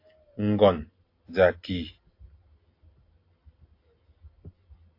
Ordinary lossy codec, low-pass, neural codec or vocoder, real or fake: AAC, 32 kbps; 5.4 kHz; none; real